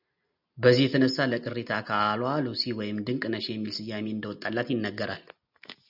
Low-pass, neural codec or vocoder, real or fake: 5.4 kHz; none; real